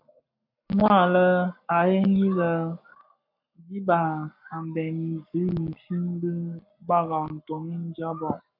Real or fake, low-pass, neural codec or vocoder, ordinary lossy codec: fake; 5.4 kHz; codec, 44.1 kHz, 7.8 kbps, Pupu-Codec; MP3, 48 kbps